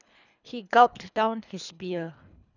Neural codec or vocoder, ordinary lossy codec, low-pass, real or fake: codec, 24 kHz, 3 kbps, HILCodec; none; 7.2 kHz; fake